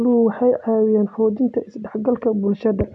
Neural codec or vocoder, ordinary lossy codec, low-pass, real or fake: none; none; 10.8 kHz; real